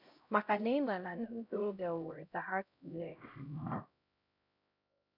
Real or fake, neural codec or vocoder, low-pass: fake; codec, 16 kHz, 0.5 kbps, X-Codec, HuBERT features, trained on LibriSpeech; 5.4 kHz